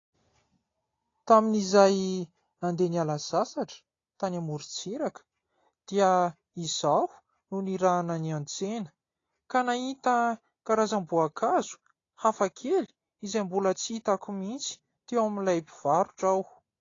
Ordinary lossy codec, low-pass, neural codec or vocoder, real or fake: AAC, 32 kbps; 7.2 kHz; none; real